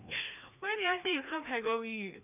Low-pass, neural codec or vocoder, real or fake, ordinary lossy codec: 3.6 kHz; codec, 16 kHz, 2 kbps, FreqCodec, larger model; fake; none